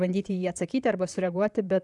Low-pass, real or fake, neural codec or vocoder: 10.8 kHz; fake; vocoder, 44.1 kHz, 128 mel bands, Pupu-Vocoder